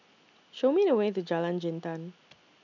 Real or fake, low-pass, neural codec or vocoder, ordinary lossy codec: real; 7.2 kHz; none; none